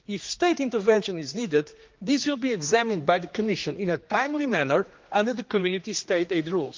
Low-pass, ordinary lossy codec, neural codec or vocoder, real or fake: 7.2 kHz; Opus, 24 kbps; codec, 16 kHz, 2 kbps, X-Codec, HuBERT features, trained on general audio; fake